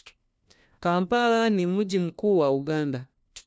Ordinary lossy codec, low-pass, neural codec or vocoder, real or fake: none; none; codec, 16 kHz, 1 kbps, FunCodec, trained on LibriTTS, 50 frames a second; fake